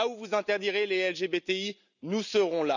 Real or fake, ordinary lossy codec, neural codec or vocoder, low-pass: real; none; none; 7.2 kHz